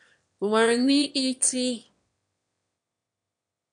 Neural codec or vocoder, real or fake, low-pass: autoencoder, 22.05 kHz, a latent of 192 numbers a frame, VITS, trained on one speaker; fake; 9.9 kHz